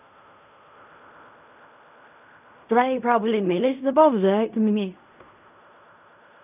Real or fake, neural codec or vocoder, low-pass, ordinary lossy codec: fake; codec, 16 kHz in and 24 kHz out, 0.4 kbps, LongCat-Audio-Codec, fine tuned four codebook decoder; 3.6 kHz; none